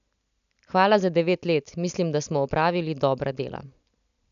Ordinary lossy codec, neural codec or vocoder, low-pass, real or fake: none; none; 7.2 kHz; real